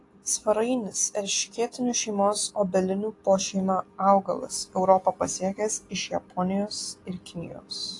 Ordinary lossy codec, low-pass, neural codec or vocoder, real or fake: AAC, 48 kbps; 10.8 kHz; none; real